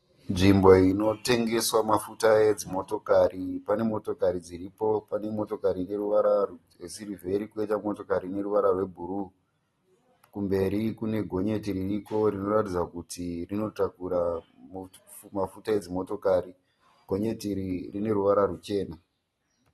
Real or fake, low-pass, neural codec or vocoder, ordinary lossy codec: real; 19.8 kHz; none; AAC, 32 kbps